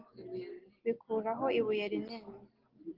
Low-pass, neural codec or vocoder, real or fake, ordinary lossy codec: 5.4 kHz; none; real; Opus, 16 kbps